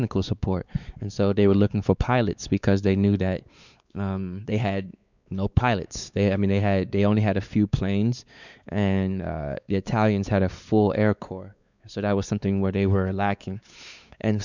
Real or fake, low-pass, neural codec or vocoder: fake; 7.2 kHz; codec, 16 kHz, 4 kbps, X-Codec, WavLM features, trained on Multilingual LibriSpeech